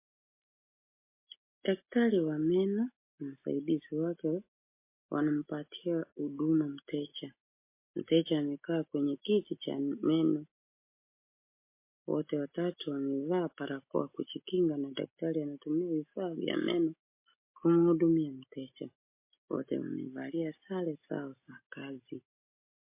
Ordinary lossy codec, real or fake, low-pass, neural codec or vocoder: MP3, 24 kbps; real; 3.6 kHz; none